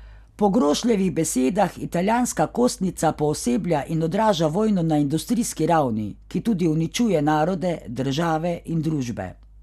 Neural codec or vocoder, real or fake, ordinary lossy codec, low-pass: none; real; none; 14.4 kHz